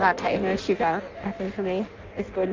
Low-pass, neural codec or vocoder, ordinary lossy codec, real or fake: 7.2 kHz; codec, 16 kHz in and 24 kHz out, 0.6 kbps, FireRedTTS-2 codec; Opus, 32 kbps; fake